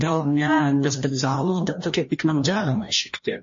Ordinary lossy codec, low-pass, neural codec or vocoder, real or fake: MP3, 32 kbps; 7.2 kHz; codec, 16 kHz, 1 kbps, FreqCodec, larger model; fake